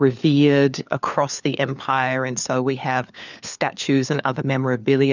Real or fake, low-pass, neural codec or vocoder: fake; 7.2 kHz; codec, 16 kHz, 4 kbps, FunCodec, trained on LibriTTS, 50 frames a second